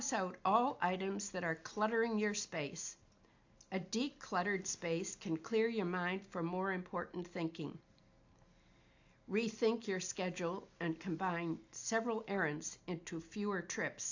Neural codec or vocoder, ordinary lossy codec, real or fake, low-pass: none; AAC, 48 kbps; real; 7.2 kHz